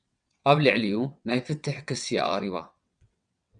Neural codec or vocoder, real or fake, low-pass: vocoder, 22.05 kHz, 80 mel bands, WaveNeXt; fake; 9.9 kHz